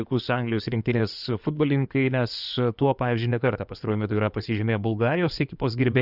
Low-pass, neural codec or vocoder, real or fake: 5.4 kHz; codec, 16 kHz in and 24 kHz out, 2.2 kbps, FireRedTTS-2 codec; fake